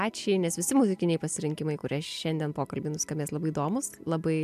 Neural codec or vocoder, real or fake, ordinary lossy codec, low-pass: none; real; AAC, 96 kbps; 14.4 kHz